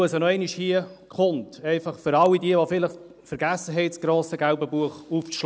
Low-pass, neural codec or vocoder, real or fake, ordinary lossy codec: none; none; real; none